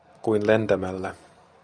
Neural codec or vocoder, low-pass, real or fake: none; 9.9 kHz; real